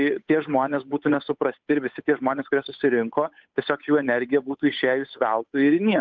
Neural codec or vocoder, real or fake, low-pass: codec, 16 kHz, 8 kbps, FunCodec, trained on Chinese and English, 25 frames a second; fake; 7.2 kHz